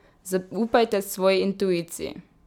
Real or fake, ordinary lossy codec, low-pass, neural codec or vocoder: real; none; 19.8 kHz; none